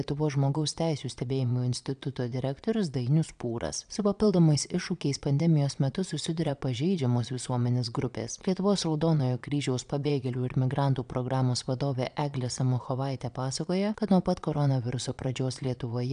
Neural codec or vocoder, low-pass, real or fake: vocoder, 22.05 kHz, 80 mel bands, Vocos; 9.9 kHz; fake